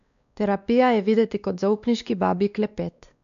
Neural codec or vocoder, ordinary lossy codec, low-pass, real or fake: codec, 16 kHz, 2 kbps, X-Codec, WavLM features, trained on Multilingual LibriSpeech; MP3, 96 kbps; 7.2 kHz; fake